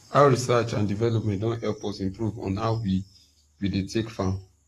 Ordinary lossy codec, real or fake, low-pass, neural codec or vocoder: AAC, 48 kbps; fake; 14.4 kHz; codec, 44.1 kHz, 7.8 kbps, Pupu-Codec